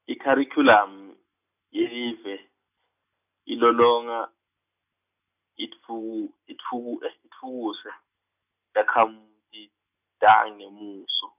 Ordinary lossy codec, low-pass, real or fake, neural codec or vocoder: none; 3.6 kHz; real; none